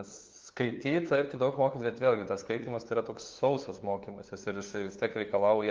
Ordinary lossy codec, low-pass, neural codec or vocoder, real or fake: Opus, 32 kbps; 7.2 kHz; codec, 16 kHz, 2 kbps, FunCodec, trained on LibriTTS, 25 frames a second; fake